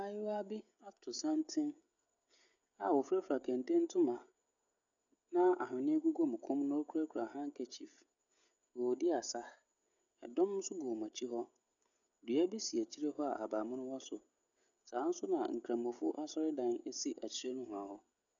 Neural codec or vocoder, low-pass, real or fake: codec, 16 kHz, 16 kbps, FreqCodec, smaller model; 7.2 kHz; fake